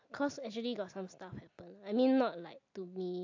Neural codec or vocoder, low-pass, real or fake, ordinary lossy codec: none; 7.2 kHz; real; AAC, 48 kbps